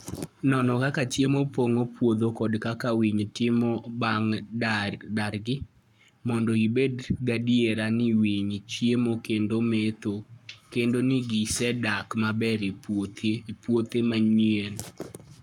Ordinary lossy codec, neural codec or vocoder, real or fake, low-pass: none; codec, 44.1 kHz, 7.8 kbps, Pupu-Codec; fake; 19.8 kHz